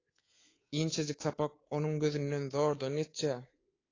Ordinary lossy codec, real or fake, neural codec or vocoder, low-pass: AAC, 32 kbps; fake; codec, 24 kHz, 3.1 kbps, DualCodec; 7.2 kHz